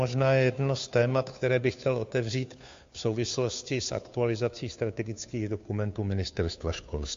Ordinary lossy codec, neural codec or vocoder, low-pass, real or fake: MP3, 48 kbps; codec, 16 kHz, 2 kbps, FunCodec, trained on Chinese and English, 25 frames a second; 7.2 kHz; fake